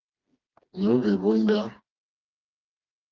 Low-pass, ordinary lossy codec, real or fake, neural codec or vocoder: 7.2 kHz; Opus, 24 kbps; fake; codec, 16 kHz, 2 kbps, FreqCodec, smaller model